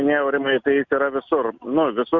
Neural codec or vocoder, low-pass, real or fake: none; 7.2 kHz; real